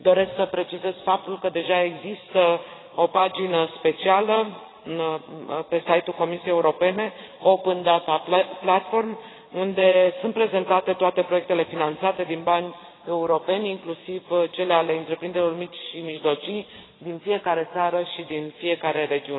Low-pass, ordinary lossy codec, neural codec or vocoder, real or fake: 7.2 kHz; AAC, 16 kbps; vocoder, 22.05 kHz, 80 mel bands, Vocos; fake